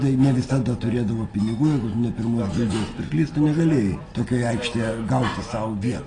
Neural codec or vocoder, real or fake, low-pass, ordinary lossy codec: none; real; 9.9 kHz; AAC, 32 kbps